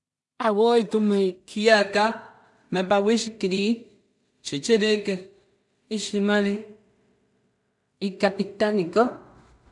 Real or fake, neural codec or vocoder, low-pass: fake; codec, 16 kHz in and 24 kHz out, 0.4 kbps, LongCat-Audio-Codec, two codebook decoder; 10.8 kHz